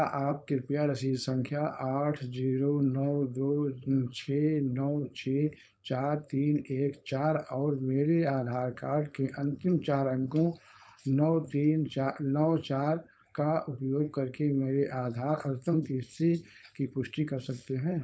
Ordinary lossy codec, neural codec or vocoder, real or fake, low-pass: none; codec, 16 kHz, 4.8 kbps, FACodec; fake; none